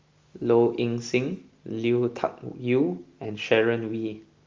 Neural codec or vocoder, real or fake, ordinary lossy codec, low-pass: none; real; Opus, 32 kbps; 7.2 kHz